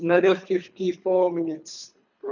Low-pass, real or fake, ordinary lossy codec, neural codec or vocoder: 7.2 kHz; fake; none; codec, 24 kHz, 3 kbps, HILCodec